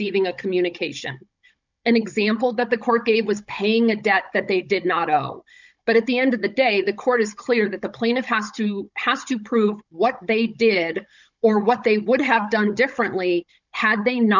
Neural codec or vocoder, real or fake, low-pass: codec, 16 kHz, 16 kbps, FunCodec, trained on Chinese and English, 50 frames a second; fake; 7.2 kHz